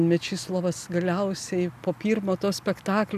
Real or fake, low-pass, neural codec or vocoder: real; 14.4 kHz; none